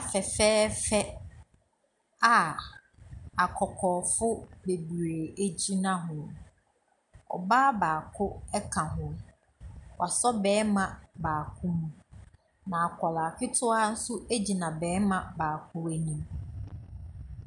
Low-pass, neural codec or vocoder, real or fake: 10.8 kHz; none; real